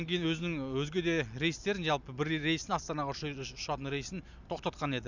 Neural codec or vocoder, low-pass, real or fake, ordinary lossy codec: none; 7.2 kHz; real; none